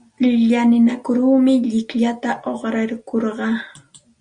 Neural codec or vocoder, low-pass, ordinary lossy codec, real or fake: none; 9.9 kHz; Opus, 64 kbps; real